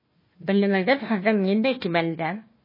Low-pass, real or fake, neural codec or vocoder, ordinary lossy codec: 5.4 kHz; fake; codec, 16 kHz, 1 kbps, FunCodec, trained on Chinese and English, 50 frames a second; MP3, 24 kbps